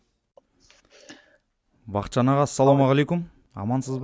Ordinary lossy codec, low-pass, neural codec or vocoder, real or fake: none; none; none; real